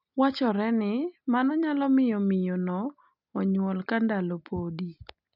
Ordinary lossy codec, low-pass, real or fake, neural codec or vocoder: none; 5.4 kHz; real; none